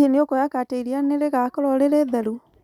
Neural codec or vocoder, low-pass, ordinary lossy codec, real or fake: none; 19.8 kHz; none; real